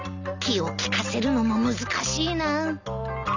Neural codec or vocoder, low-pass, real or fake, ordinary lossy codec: none; 7.2 kHz; real; none